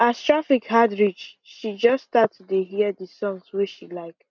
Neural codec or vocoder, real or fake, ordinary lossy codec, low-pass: none; real; none; 7.2 kHz